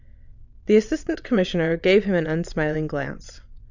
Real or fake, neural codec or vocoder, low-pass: fake; vocoder, 22.05 kHz, 80 mel bands, WaveNeXt; 7.2 kHz